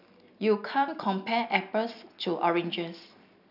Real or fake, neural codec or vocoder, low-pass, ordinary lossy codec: real; none; 5.4 kHz; none